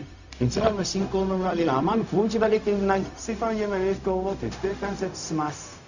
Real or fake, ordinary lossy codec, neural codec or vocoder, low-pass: fake; none; codec, 16 kHz, 0.4 kbps, LongCat-Audio-Codec; 7.2 kHz